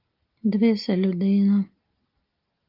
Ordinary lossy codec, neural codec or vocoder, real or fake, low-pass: Opus, 32 kbps; none; real; 5.4 kHz